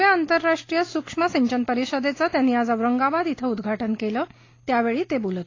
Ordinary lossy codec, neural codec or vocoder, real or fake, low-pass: AAC, 32 kbps; none; real; 7.2 kHz